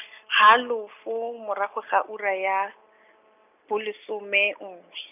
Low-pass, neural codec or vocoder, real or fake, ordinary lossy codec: 3.6 kHz; none; real; none